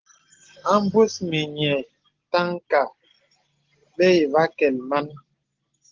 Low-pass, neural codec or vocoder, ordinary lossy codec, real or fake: 7.2 kHz; none; Opus, 16 kbps; real